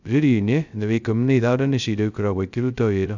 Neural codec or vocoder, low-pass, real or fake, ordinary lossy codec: codec, 16 kHz, 0.2 kbps, FocalCodec; 7.2 kHz; fake; none